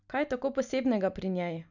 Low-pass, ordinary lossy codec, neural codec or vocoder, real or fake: 7.2 kHz; none; none; real